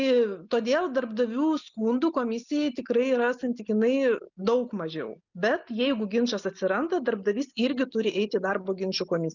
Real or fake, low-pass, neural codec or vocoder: real; 7.2 kHz; none